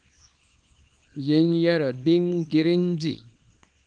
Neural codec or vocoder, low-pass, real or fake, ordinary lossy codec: codec, 24 kHz, 0.9 kbps, WavTokenizer, small release; 9.9 kHz; fake; Opus, 32 kbps